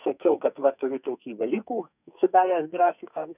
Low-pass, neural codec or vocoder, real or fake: 3.6 kHz; codec, 44.1 kHz, 2.6 kbps, SNAC; fake